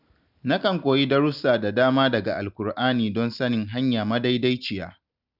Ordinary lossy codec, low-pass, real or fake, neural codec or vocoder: none; 5.4 kHz; real; none